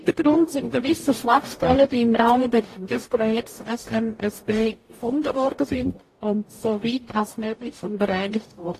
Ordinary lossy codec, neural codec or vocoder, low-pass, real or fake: AAC, 48 kbps; codec, 44.1 kHz, 0.9 kbps, DAC; 14.4 kHz; fake